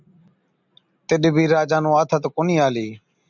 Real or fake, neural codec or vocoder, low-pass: real; none; 7.2 kHz